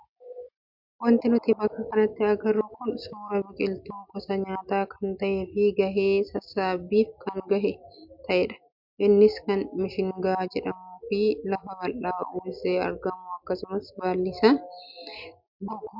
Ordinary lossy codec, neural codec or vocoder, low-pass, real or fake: MP3, 48 kbps; none; 5.4 kHz; real